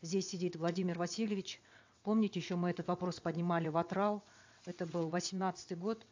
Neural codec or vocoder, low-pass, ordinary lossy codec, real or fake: vocoder, 22.05 kHz, 80 mel bands, WaveNeXt; 7.2 kHz; AAC, 48 kbps; fake